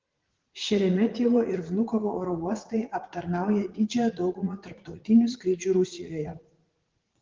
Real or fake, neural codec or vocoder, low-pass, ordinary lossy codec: fake; vocoder, 44.1 kHz, 80 mel bands, Vocos; 7.2 kHz; Opus, 16 kbps